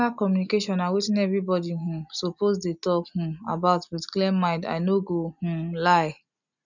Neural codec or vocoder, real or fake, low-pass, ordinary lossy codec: none; real; 7.2 kHz; none